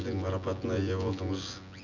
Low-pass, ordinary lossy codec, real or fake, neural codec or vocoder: 7.2 kHz; none; fake; vocoder, 24 kHz, 100 mel bands, Vocos